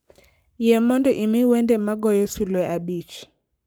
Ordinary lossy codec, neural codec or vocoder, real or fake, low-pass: none; codec, 44.1 kHz, 7.8 kbps, Pupu-Codec; fake; none